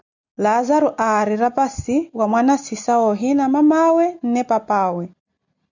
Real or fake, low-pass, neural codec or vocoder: real; 7.2 kHz; none